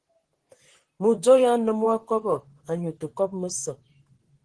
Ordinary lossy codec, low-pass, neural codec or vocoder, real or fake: Opus, 16 kbps; 9.9 kHz; vocoder, 44.1 kHz, 128 mel bands, Pupu-Vocoder; fake